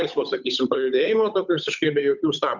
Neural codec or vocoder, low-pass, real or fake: codec, 16 kHz, 8 kbps, FunCodec, trained on Chinese and English, 25 frames a second; 7.2 kHz; fake